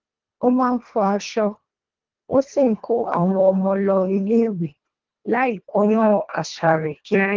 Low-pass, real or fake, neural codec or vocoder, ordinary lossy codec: 7.2 kHz; fake; codec, 24 kHz, 1.5 kbps, HILCodec; Opus, 32 kbps